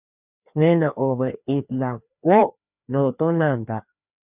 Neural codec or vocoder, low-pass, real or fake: codec, 16 kHz, 2 kbps, FreqCodec, larger model; 3.6 kHz; fake